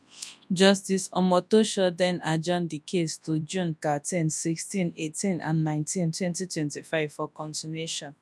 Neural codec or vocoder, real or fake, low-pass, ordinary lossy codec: codec, 24 kHz, 0.9 kbps, WavTokenizer, large speech release; fake; none; none